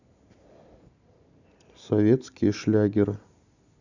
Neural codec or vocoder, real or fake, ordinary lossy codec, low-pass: none; real; none; 7.2 kHz